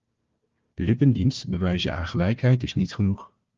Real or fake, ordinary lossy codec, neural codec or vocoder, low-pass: fake; Opus, 32 kbps; codec, 16 kHz, 1 kbps, FunCodec, trained on Chinese and English, 50 frames a second; 7.2 kHz